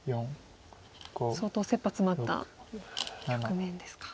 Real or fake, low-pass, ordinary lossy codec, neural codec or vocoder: real; none; none; none